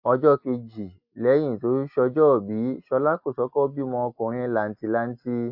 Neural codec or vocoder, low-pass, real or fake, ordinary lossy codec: none; 5.4 kHz; real; AAC, 48 kbps